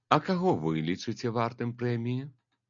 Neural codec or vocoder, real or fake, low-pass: none; real; 7.2 kHz